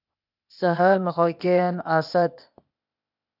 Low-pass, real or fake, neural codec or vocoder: 5.4 kHz; fake; codec, 16 kHz, 0.8 kbps, ZipCodec